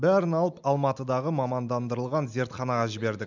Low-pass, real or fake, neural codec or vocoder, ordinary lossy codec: 7.2 kHz; real; none; none